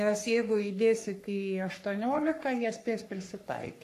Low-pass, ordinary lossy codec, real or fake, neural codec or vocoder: 14.4 kHz; AAC, 64 kbps; fake; codec, 44.1 kHz, 3.4 kbps, Pupu-Codec